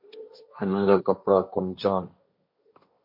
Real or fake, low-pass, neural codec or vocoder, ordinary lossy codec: fake; 5.4 kHz; codec, 16 kHz, 1.1 kbps, Voila-Tokenizer; MP3, 32 kbps